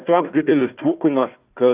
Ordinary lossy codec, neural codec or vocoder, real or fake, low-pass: Opus, 24 kbps; codec, 16 kHz, 1 kbps, FunCodec, trained on Chinese and English, 50 frames a second; fake; 3.6 kHz